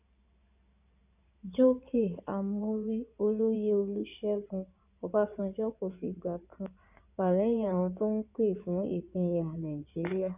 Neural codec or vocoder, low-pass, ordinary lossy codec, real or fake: codec, 16 kHz in and 24 kHz out, 2.2 kbps, FireRedTTS-2 codec; 3.6 kHz; none; fake